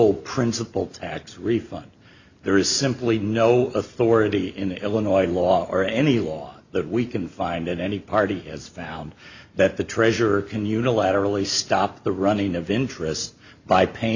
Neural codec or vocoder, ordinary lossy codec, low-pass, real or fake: none; Opus, 64 kbps; 7.2 kHz; real